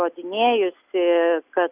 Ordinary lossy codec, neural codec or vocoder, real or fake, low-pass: Opus, 64 kbps; none; real; 3.6 kHz